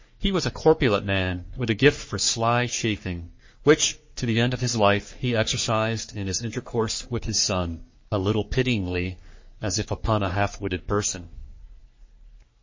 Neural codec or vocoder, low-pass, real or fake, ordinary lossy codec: codec, 44.1 kHz, 3.4 kbps, Pupu-Codec; 7.2 kHz; fake; MP3, 32 kbps